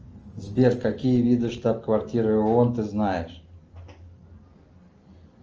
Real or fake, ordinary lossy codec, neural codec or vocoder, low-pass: real; Opus, 24 kbps; none; 7.2 kHz